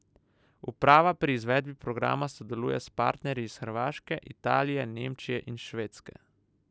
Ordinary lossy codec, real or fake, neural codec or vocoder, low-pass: none; real; none; none